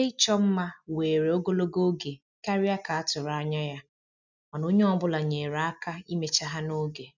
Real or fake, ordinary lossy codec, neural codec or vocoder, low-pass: real; none; none; 7.2 kHz